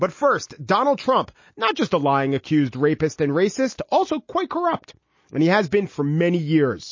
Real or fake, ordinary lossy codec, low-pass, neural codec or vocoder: real; MP3, 32 kbps; 7.2 kHz; none